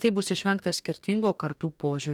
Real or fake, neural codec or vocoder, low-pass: fake; codec, 44.1 kHz, 2.6 kbps, DAC; 19.8 kHz